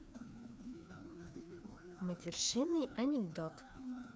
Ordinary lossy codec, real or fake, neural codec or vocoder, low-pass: none; fake; codec, 16 kHz, 2 kbps, FreqCodec, larger model; none